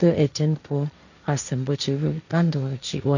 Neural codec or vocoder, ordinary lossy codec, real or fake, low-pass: codec, 16 kHz, 1.1 kbps, Voila-Tokenizer; none; fake; 7.2 kHz